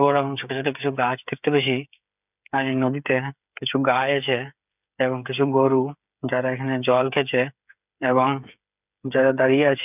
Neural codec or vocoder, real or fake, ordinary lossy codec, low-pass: codec, 16 kHz, 8 kbps, FreqCodec, smaller model; fake; none; 3.6 kHz